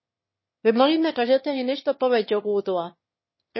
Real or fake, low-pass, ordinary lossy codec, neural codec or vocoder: fake; 7.2 kHz; MP3, 24 kbps; autoencoder, 22.05 kHz, a latent of 192 numbers a frame, VITS, trained on one speaker